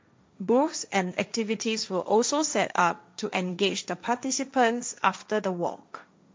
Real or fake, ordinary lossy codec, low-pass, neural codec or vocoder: fake; none; none; codec, 16 kHz, 1.1 kbps, Voila-Tokenizer